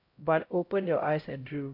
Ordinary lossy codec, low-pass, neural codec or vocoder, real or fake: AAC, 32 kbps; 5.4 kHz; codec, 16 kHz, 0.5 kbps, X-Codec, HuBERT features, trained on LibriSpeech; fake